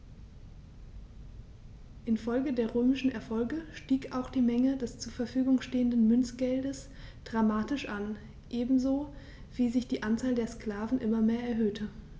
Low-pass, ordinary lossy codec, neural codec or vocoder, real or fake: none; none; none; real